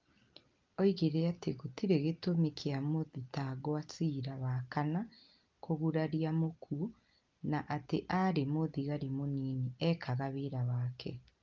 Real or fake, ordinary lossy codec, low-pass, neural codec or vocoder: real; Opus, 32 kbps; 7.2 kHz; none